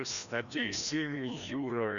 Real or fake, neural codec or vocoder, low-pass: fake; codec, 16 kHz, 1 kbps, FreqCodec, larger model; 7.2 kHz